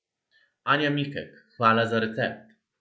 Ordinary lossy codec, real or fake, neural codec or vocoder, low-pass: none; real; none; none